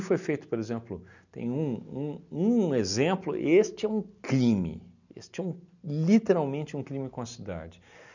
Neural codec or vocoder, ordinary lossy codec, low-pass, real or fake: none; none; 7.2 kHz; real